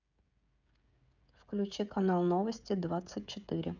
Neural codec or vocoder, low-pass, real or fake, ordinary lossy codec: codec, 16 kHz, 16 kbps, FreqCodec, smaller model; 7.2 kHz; fake; none